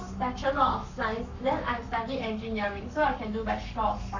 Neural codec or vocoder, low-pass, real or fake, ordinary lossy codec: codec, 44.1 kHz, 7.8 kbps, Pupu-Codec; 7.2 kHz; fake; none